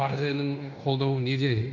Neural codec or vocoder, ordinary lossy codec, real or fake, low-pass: codec, 16 kHz in and 24 kHz out, 0.9 kbps, LongCat-Audio-Codec, fine tuned four codebook decoder; none; fake; 7.2 kHz